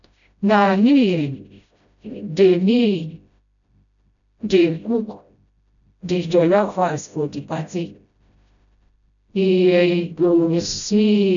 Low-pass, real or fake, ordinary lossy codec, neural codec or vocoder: 7.2 kHz; fake; none; codec, 16 kHz, 0.5 kbps, FreqCodec, smaller model